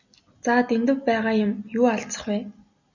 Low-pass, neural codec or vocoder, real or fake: 7.2 kHz; none; real